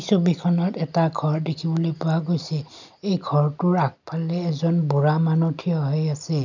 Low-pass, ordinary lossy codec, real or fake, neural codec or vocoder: 7.2 kHz; none; real; none